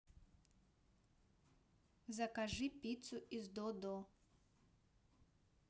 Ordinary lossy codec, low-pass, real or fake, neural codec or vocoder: none; none; real; none